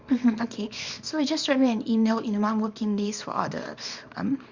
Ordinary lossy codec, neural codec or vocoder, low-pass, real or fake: Opus, 64 kbps; codec, 24 kHz, 0.9 kbps, WavTokenizer, small release; 7.2 kHz; fake